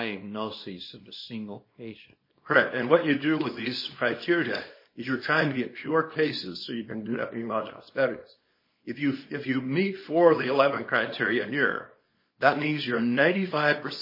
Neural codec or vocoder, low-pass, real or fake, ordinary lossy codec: codec, 24 kHz, 0.9 kbps, WavTokenizer, small release; 5.4 kHz; fake; MP3, 24 kbps